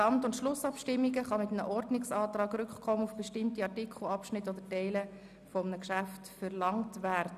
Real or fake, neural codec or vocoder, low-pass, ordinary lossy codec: real; none; 14.4 kHz; none